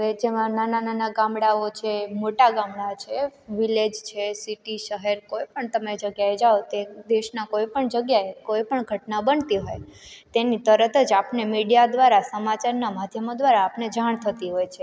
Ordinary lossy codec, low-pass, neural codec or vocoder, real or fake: none; none; none; real